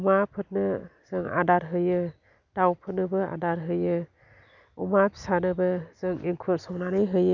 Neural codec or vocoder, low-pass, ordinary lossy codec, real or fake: none; 7.2 kHz; none; real